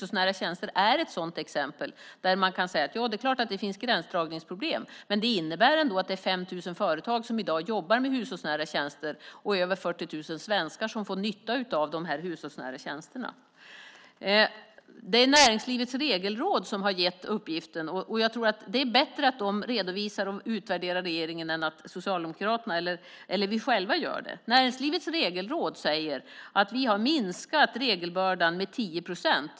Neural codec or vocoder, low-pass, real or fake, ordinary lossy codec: none; none; real; none